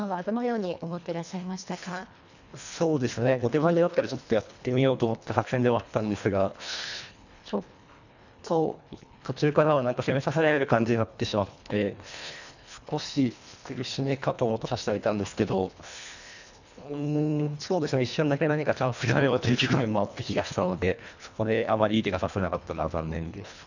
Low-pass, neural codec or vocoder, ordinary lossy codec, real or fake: 7.2 kHz; codec, 24 kHz, 1.5 kbps, HILCodec; none; fake